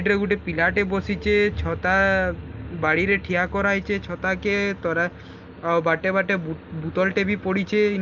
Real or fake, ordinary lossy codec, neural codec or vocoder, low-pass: real; Opus, 32 kbps; none; 7.2 kHz